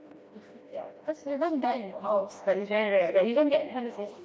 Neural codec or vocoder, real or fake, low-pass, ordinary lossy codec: codec, 16 kHz, 1 kbps, FreqCodec, smaller model; fake; none; none